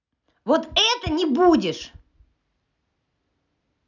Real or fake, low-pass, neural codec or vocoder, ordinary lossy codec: real; 7.2 kHz; none; none